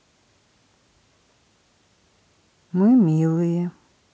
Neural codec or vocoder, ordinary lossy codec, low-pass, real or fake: none; none; none; real